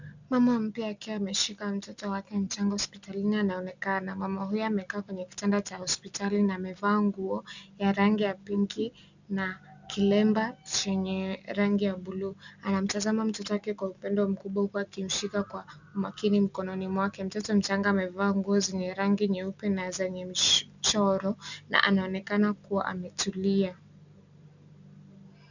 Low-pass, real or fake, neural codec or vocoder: 7.2 kHz; real; none